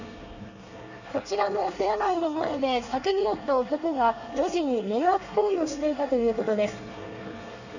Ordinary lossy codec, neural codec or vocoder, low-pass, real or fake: none; codec, 24 kHz, 1 kbps, SNAC; 7.2 kHz; fake